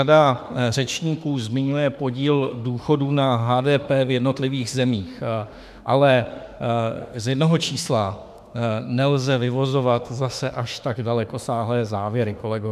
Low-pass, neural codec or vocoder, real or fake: 14.4 kHz; autoencoder, 48 kHz, 32 numbers a frame, DAC-VAE, trained on Japanese speech; fake